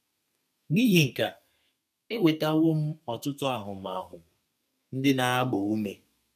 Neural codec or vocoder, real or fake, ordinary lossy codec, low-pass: codec, 32 kHz, 1.9 kbps, SNAC; fake; none; 14.4 kHz